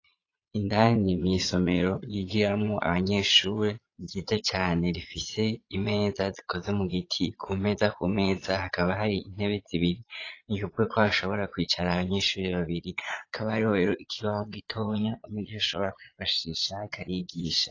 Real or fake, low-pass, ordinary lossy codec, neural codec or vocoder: fake; 7.2 kHz; AAC, 32 kbps; vocoder, 22.05 kHz, 80 mel bands, Vocos